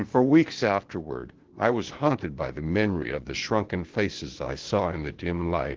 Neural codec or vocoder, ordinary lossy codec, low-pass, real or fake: codec, 16 kHz, 0.8 kbps, ZipCodec; Opus, 16 kbps; 7.2 kHz; fake